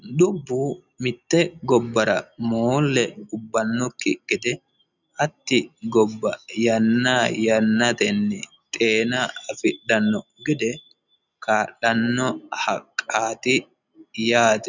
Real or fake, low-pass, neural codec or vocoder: real; 7.2 kHz; none